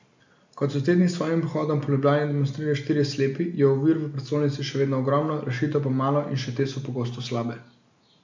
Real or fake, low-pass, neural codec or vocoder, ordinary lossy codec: real; 7.2 kHz; none; MP3, 64 kbps